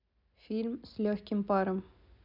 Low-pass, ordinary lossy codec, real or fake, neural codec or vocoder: 5.4 kHz; none; real; none